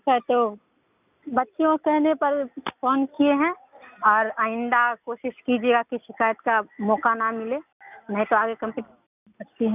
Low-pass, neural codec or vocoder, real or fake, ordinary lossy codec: 3.6 kHz; none; real; none